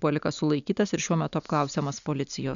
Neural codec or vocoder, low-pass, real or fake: none; 7.2 kHz; real